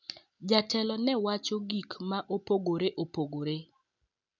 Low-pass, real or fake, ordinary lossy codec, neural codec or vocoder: 7.2 kHz; real; none; none